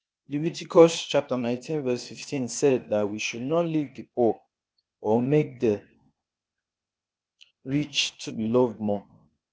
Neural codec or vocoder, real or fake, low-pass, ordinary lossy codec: codec, 16 kHz, 0.8 kbps, ZipCodec; fake; none; none